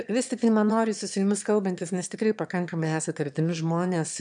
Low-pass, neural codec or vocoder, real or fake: 9.9 kHz; autoencoder, 22.05 kHz, a latent of 192 numbers a frame, VITS, trained on one speaker; fake